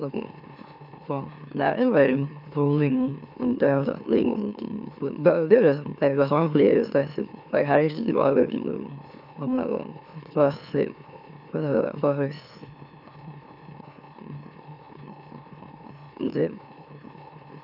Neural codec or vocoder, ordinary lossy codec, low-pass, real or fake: autoencoder, 44.1 kHz, a latent of 192 numbers a frame, MeloTTS; none; 5.4 kHz; fake